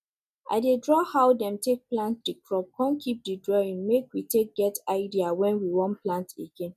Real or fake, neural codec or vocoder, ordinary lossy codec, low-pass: real; none; none; 14.4 kHz